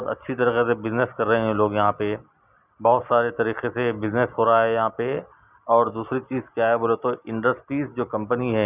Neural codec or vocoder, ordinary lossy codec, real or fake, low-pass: none; none; real; 3.6 kHz